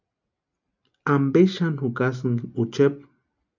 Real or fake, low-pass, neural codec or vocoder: real; 7.2 kHz; none